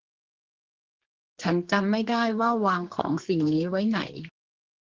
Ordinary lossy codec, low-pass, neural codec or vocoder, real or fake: Opus, 16 kbps; 7.2 kHz; codec, 32 kHz, 1.9 kbps, SNAC; fake